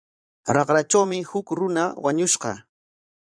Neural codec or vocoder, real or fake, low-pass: vocoder, 22.05 kHz, 80 mel bands, Vocos; fake; 9.9 kHz